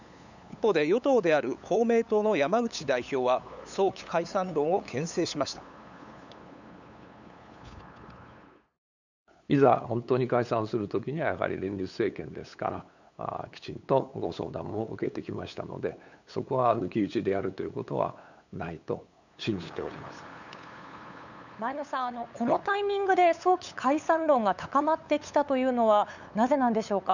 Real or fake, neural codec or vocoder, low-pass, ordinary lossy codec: fake; codec, 16 kHz, 8 kbps, FunCodec, trained on LibriTTS, 25 frames a second; 7.2 kHz; none